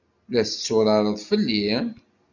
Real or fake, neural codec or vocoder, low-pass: real; none; 7.2 kHz